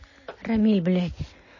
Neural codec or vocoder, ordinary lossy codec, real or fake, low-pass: none; MP3, 32 kbps; real; 7.2 kHz